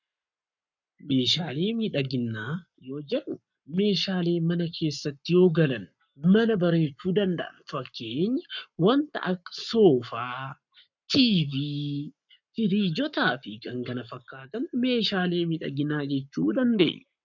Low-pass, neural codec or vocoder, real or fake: 7.2 kHz; codec, 44.1 kHz, 7.8 kbps, Pupu-Codec; fake